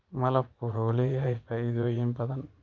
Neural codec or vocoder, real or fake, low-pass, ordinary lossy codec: vocoder, 44.1 kHz, 80 mel bands, Vocos; fake; 7.2 kHz; Opus, 32 kbps